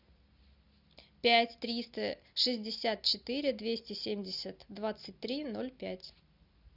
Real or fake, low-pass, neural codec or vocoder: real; 5.4 kHz; none